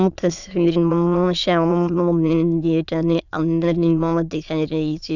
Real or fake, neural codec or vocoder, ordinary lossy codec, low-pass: fake; autoencoder, 22.05 kHz, a latent of 192 numbers a frame, VITS, trained on many speakers; none; 7.2 kHz